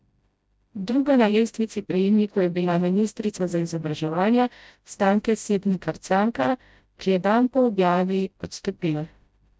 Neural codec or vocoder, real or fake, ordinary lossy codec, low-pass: codec, 16 kHz, 0.5 kbps, FreqCodec, smaller model; fake; none; none